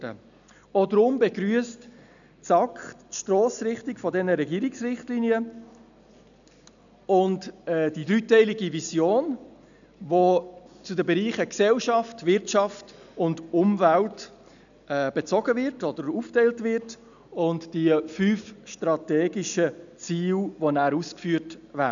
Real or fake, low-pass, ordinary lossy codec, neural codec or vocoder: real; 7.2 kHz; none; none